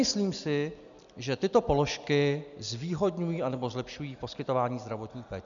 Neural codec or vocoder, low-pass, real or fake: none; 7.2 kHz; real